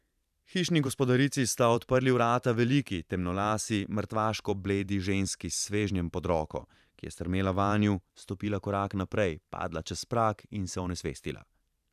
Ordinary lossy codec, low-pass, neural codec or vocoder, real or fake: none; 14.4 kHz; vocoder, 44.1 kHz, 128 mel bands every 512 samples, BigVGAN v2; fake